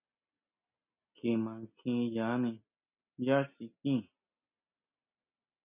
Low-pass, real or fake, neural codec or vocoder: 3.6 kHz; real; none